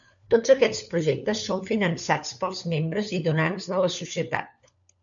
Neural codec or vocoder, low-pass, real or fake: codec, 16 kHz, 4 kbps, FunCodec, trained on LibriTTS, 50 frames a second; 7.2 kHz; fake